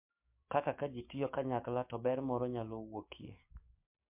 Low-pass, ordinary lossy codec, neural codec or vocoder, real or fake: 3.6 kHz; MP3, 32 kbps; codec, 16 kHz, 6 kbps, DAC; fake